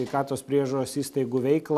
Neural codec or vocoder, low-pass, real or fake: none; 14.4 kHz; real